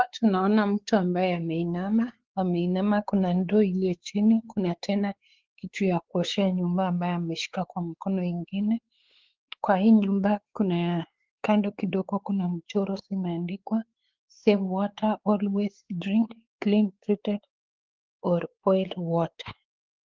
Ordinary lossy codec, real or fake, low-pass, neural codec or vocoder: Opus, 16 kbps; fake; 7.2 kHz; codec, 16 kHz, 4 kbps, X-Codec, WavLM features, trained on Multilingual LibriSpeech